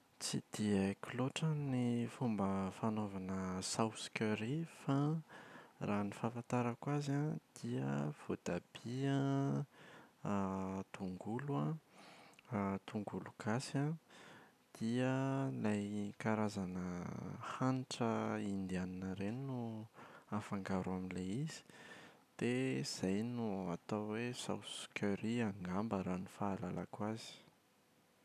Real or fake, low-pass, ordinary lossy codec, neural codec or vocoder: real; 14.4 kHz; none; none